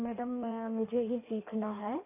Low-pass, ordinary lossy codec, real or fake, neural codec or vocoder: 3.6 kHz; AAC, 24 kbps; fake; codec, 16 kHz in and 24 kHz out, 1.1 kbps, FireRedTTS-2 codec